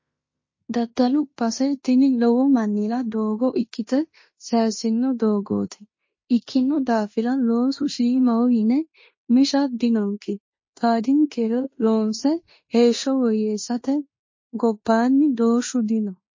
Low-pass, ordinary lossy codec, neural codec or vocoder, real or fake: 7.2 kHz; MP3, 32 kbps; codec, 16 kHz in and 24 kHz out, 0.9 kbps, LongCat-Audio-Codec, fine tuned four codebook decoder; fake